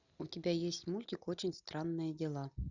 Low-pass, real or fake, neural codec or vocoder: 7.2 kHz; real; none